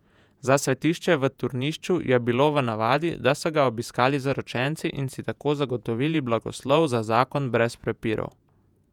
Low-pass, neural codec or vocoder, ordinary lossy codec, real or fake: 19.8 kHz; vocoder, 48 kHz, 128 mel bands, Vocos; none; fake